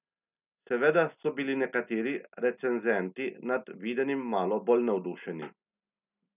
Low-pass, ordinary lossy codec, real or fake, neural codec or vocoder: 3.6 kHz; none; real; none